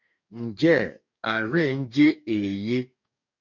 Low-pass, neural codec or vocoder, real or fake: 7.2 kHz; codec, 44.1 kHz, 2.6 kbps, DAC; fake